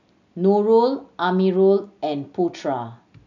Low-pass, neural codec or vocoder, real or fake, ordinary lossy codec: 7.2 kHz; none; real; none